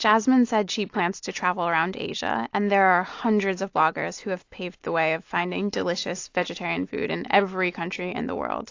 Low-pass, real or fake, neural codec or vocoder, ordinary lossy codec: 7.2 kHz; real; none; AAC, 48 kbps